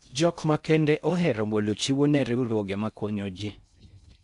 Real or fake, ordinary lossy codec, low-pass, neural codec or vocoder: fake; MP3, 96 kbps; 10.8 kHz; codec, 16 kHz in and 24 kHz out, 0.6 kbps, FocalCodec, streaming, 4096 codes